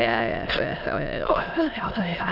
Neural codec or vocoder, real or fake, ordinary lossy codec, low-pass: autoencoder, 22.05 kHz, a latent of 192 numbers a frame, VITS, trained on many speakers; fake; none; 5.4 kHz